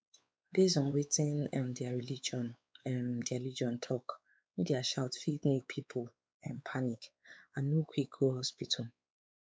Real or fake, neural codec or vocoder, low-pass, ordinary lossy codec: fake; codec, 16 kHz, 4 kbps, X-Codec, WavLM features, trained on Multilingual LibriSpeech; none; none